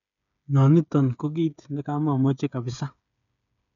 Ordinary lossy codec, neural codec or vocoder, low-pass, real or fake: none; codec, 16 kHz, 8 kbps, FreqCodec, smaller model; 7.2 kHz; fake